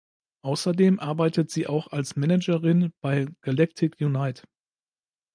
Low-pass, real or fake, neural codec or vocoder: 9.9 kHz; real; none